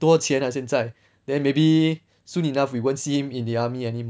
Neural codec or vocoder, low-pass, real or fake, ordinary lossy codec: none; none; real; none